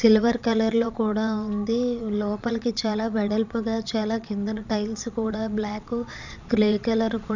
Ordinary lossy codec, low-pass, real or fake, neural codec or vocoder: none; 7.2 kHz; fake; vocoder, 22.05 kHz, 80 mel bands, Vocos